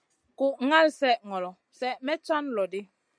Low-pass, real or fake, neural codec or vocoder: 9.9 kHz; real; none